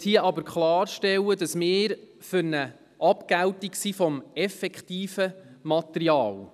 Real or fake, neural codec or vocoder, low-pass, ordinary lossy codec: real; none; 14.4 kHz; none